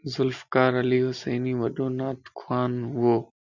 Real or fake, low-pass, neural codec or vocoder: real; 7.2 kHz; none